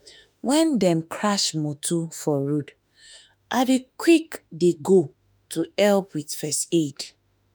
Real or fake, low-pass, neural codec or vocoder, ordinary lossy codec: fake; none; autoencoder, 48 kHz, 32 numbers a frame, DAC-VAE, trained on Japanese speech; none